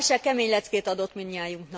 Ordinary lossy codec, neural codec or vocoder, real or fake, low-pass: none; none; real; none